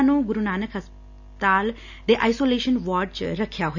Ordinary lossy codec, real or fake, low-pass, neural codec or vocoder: none; real; 7.2 kHz; none